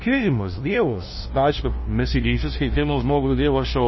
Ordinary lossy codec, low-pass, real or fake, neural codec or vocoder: MP3, 24 kbps; 7.2 kHz; fake; codec, 16 kHz, 0.5 kbps, FunCodec, trained on LibriTTS, 25 frames a second